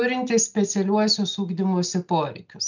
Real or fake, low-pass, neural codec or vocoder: real; 7.2 kHz; none